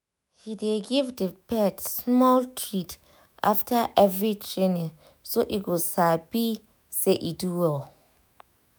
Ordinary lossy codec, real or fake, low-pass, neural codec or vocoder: none; fake; none; autoencoder, 48 kHz, 128 numbers a frame, DAC-VAE, trained on Japanese speech